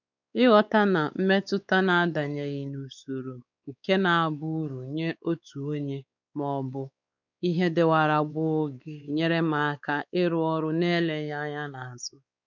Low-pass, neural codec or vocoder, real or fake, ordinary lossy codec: 7.2 kHz; codec, 16 kHz, 4 kbps, X-Codec, WavLM features, trained on Multilingual LibriSpeech; fake; none